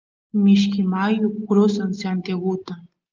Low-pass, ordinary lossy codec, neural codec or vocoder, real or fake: 7.2 kHz; Opus, 32 kbps; none; real